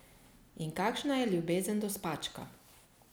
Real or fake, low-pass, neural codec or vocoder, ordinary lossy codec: real; none; none; none